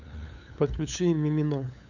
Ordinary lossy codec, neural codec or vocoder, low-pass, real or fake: AAC, 48 kbps; codec, 16 kHz, 8 kbps, FunCodec, trained on LibriTTS, 25 frames a second; 7.2 kHz; fake